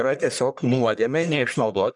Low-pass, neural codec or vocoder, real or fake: 10.8 kHz; codec, 44.1 kHz, 1.7 kbps, Pupu-Codec; fake